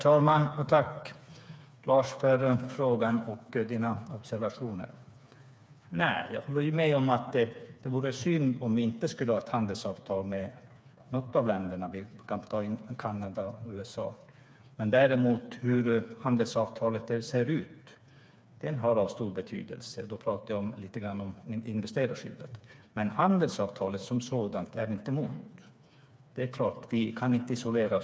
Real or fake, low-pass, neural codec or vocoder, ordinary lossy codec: fake; none; codec, 16 kHz, 4 kbps, FreqCodec, smaller model; none